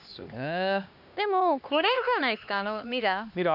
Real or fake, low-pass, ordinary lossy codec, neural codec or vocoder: fake; 5.4 kHz; none; codec, 16 kHz, 2 kbps, X-Codec, HuBERT features, trained on LibriSpeech